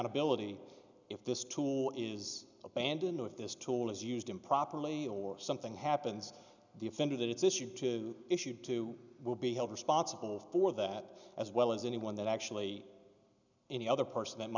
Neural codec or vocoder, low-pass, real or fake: none; 7.2 kHz; real